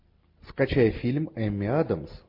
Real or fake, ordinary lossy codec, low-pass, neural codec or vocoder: real; MP3, 32 kbps; 5.4 kHz; none